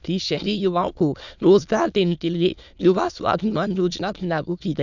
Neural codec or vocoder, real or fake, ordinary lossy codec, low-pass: autoencoder, 22.05 kHz, a latent of 192 numbers a frame, VITS, trained on many speakers; fake; none; 7.2 kHz